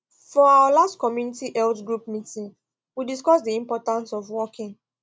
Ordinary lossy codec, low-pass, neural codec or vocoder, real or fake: none; none; none; real